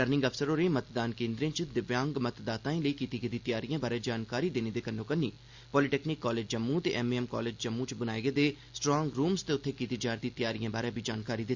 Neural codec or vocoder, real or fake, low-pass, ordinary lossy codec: none; real; 7.2 kHz; Opus, 64 kbps